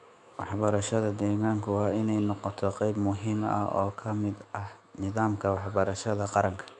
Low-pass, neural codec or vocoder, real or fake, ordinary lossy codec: 10.8 kHz; autoencoder, 48 kHz, 128 numbers a frame, DAC-VAE, trained on Japanese speech; fake; none